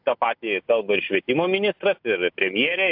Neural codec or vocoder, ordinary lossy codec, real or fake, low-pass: none; AAC, 48 kbps; real; 5.4 kHz